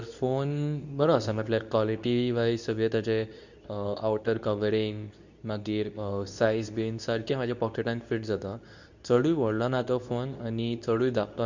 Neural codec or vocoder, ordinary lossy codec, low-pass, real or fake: codec, 24 kHz, 0.9 kbps, WavTokenizer, medium speech release version 2; none; 7.2 kHz; fake